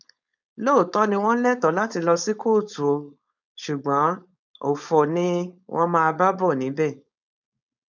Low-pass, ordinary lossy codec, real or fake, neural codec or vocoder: 7.2 kHz; none; fake; codec, 16 kHz, 4.8 kbps, FACodec